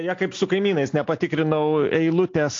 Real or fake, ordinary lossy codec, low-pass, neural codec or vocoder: real; AAC, 48 kbps; 7.2 kHz; none